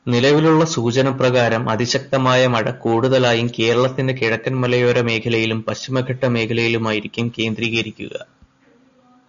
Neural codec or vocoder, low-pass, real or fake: none; 7.2 kHz; real